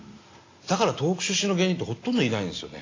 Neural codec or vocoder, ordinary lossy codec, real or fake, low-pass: none; AAC, 32 kbps; real; 7.2 kHz